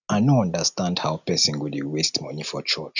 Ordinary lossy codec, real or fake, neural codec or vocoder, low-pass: none; real; none; 7.2 kHz